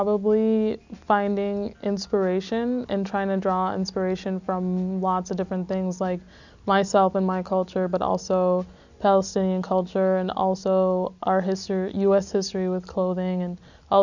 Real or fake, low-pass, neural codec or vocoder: real; 7.2 kHz; none